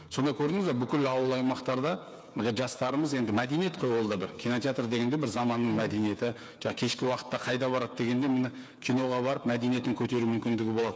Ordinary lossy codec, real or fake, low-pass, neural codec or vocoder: none; fake; none; codec, 16 kHz, 8 kbps, FreqCodec, smaller model